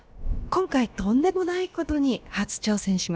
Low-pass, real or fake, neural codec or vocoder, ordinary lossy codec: none; fake; codec, 16 kHz, about 1 kbps, DyCAST, with the encoder's durations; none